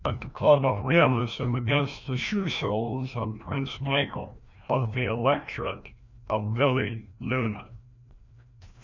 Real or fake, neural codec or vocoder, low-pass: fake; codec, 16 kHz, 1 kbps, FreqCodec, larger model; 7.2 kHz